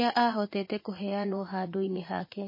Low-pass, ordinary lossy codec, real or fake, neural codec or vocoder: 5.4 kHz; MP3, 24 kbps; fake; vocoder, 44.1 kHz, 80 mel bands, Vocos